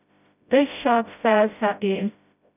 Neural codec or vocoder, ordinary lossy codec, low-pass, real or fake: codec, 16 kHz, 0.5 kbps, FreqCodec, smaller model; none; 3.6 kHz; fake